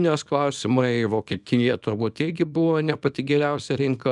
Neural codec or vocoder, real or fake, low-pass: codec, 24 kHz, 0.9 kbps, WavTokenizer, small release; fake; 10.8 kHz